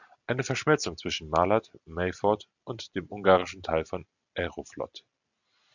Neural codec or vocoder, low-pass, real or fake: none; 7.2 kHz; real